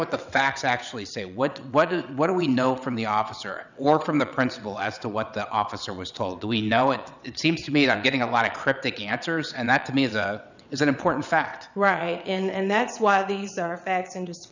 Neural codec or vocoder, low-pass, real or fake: vocoder, 22.05 kHz, 80 mel bands, WaveNeXt; 7.2 kHz; fake